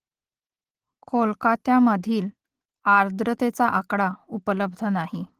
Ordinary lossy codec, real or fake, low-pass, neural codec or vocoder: Opus, 16 kbps; real; 14.4 kHz; none